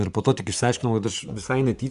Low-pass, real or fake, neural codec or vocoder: 10.8 kHz; fake; vocoder, 24 kHz, 100 mel bands, Vocos